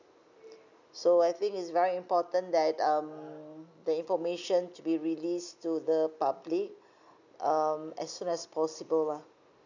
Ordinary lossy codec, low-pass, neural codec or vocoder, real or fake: none; 7.2 kHz; none; real